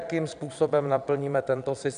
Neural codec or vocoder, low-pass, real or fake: vocoder, 22.05 kHz, 80 mel bands, Vocos; 9.9 kHz; fake